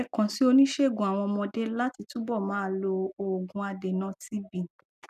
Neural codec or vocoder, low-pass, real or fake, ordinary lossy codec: none; 14.4 kHz; real; none